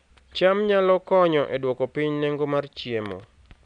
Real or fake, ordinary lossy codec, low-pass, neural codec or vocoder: real; none; 9.9 kHz; none